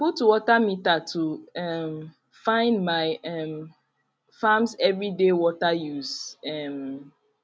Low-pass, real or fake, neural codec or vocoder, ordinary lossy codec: none; real; none; none